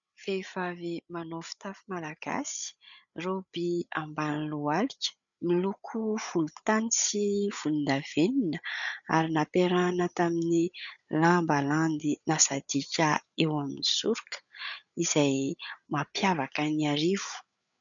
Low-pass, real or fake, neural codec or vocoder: 7.2 kHz; fake; codec, 16 kHz, 8 kbps, FreqCodec, larger model